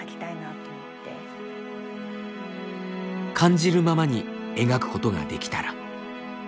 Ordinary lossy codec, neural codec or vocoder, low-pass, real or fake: none; none; none; real